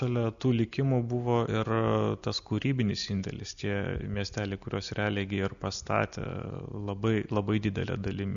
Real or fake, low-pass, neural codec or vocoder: real; 7.2 kHz; none